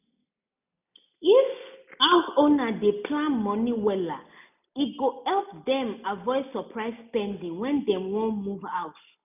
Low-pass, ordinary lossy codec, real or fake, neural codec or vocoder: 3.6 kHz; none; real; none